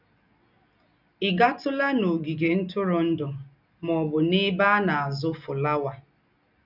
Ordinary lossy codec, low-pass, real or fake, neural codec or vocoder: none; 5.4 kHz; real; none